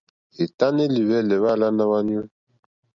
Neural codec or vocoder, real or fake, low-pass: none; real; 7.2 kHz